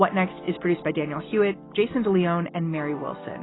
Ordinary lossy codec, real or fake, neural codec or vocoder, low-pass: AAC, 16 kbps; real; none; 7.2 kHz